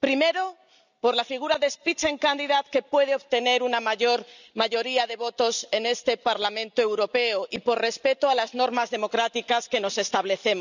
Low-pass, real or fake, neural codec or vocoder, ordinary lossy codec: 7.2 kHz; real; none; none